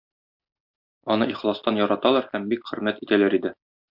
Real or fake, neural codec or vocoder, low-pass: real; none; 5.4 kHz